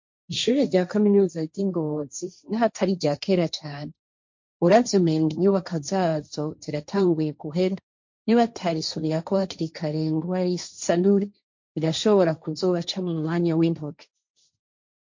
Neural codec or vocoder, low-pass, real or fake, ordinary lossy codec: codec, 16 kHz, 1.1 kbps, Voila-Tokenizer; 7.2 kHz; fake; MP3, 48 kbps